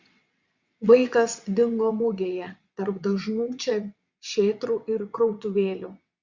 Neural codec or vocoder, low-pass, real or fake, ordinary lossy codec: vocoder, 22.05 kHz, 80 mel bands, WaveNeXt; 7.2 kHz; fake; Opus, 64 kbps